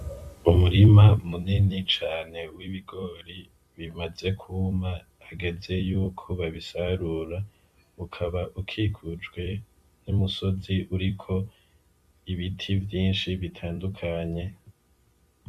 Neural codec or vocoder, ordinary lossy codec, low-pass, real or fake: vocoder, 44.1 kHz, 128 mel bands every 256 samples, BigVGAN v2; Opus, 64 kbps; 14.4 kHz; fake